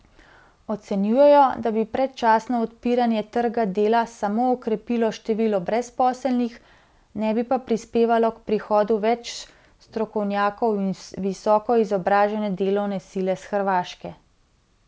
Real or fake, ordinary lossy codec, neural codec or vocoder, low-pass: real; none; none; none